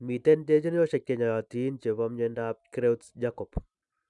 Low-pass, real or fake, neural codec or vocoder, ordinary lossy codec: 10.8 kHz; real; none; none